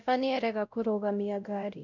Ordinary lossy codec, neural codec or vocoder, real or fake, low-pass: none; codec, 16 kHz, 0.5 kbps, X-Codec, WavLM features, trained on Multilingual LibriSpeech; fake; 7.2 kHz